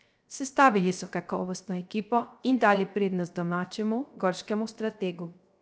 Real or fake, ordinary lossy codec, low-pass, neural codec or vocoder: fake; none; none; codec, 16 kHz, 0.3 kbps, FocalCodec